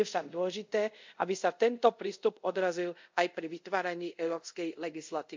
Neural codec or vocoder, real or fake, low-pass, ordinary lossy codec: codec, 24 kHz, 0.5 kbps, DualCodec; fake; 7.2 kHz; MP3, 64 kbps